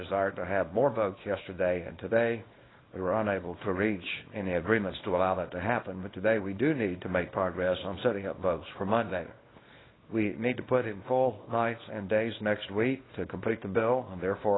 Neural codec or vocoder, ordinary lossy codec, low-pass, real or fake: codec, 24 kHz, 0.9 kbps, WavTokenizer, small release; AAC, 16 kbps; 7.2 kHz; fake